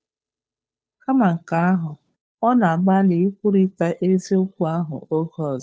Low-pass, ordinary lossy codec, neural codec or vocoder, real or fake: none; none; codec, 16 kHz, 2 kbps, FunCodec, trained on Chinese and English, 25 frames a second; fake